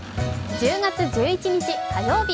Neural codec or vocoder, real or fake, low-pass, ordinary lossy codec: none; real; none; none